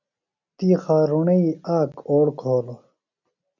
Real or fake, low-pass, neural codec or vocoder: real; 7.2 kHz; none